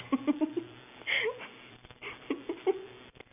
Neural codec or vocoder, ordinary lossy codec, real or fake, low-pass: none; none; real; 3.6 kHz